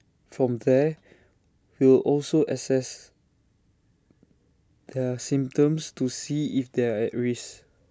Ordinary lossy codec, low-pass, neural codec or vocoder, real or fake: none; none; none; real